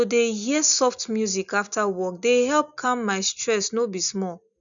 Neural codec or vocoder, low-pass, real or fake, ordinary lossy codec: none; 7.2 kHz; real; none